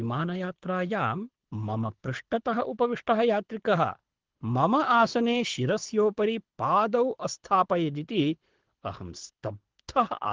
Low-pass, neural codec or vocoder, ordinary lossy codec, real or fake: 7.2 kHz; codec, 24 kHz, 6 kbps, HILCodec; Opus, 16 kbps; fake